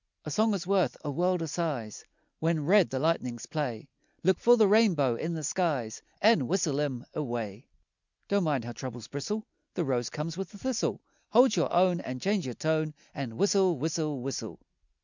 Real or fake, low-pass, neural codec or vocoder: real; 7.2 kHz; none